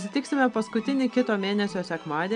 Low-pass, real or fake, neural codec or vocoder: 9.9 kHz; real; none